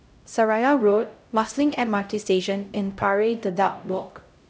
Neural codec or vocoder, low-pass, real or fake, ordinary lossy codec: codec, 16 kHz, 0.5 kbps, X-Codec, HuBERT features, trained on LibriSpeech; none; fake; none